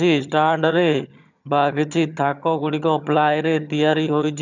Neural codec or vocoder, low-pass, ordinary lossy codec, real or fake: vocoder, 22.05 kHz, 80 mel bands, HiFi-GAN; 7.2 kHz; none; fake